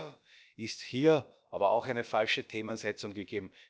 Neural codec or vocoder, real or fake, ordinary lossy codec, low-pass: codec, 16 kHz, about 1 kbps, DyCAST, with the encoder's durations; fake; none; none